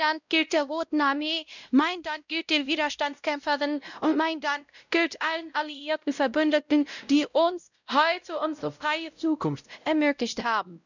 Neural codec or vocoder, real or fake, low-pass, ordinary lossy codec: codec, 16 kHz, 0.5 kbps, X-Codec, WavLM features, trained on Multilingual LibriSpeech; fake; 7.2 kHz; none